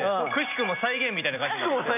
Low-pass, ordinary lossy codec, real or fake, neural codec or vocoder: 3.6 kHz; none; real; none